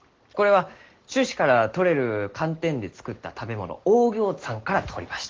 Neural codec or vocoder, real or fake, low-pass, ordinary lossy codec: none; real; 7.2 kHz; Opus, 16 kbps